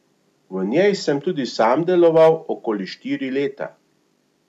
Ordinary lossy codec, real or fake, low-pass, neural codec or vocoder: none; real; 14.4 kHz; none